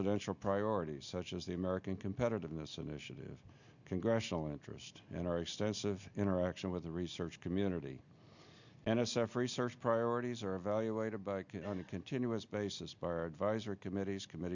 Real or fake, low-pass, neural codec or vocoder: real; 7.2 kHz; none